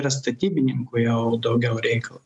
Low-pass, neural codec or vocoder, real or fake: 10.8 kHz; none; real